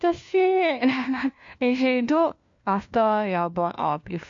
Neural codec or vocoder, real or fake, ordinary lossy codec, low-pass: codec, 16 kHz, 1 kbps, FunCodec, trained on LibriTTS, 50 frames a second; fake; MP3, 48 kbps; 7.2 kHz